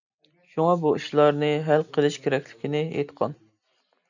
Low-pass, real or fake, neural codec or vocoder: 7.2 kHz; real; none